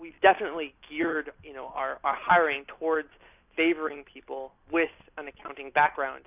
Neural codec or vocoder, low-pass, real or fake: none; 3.6 kHz; real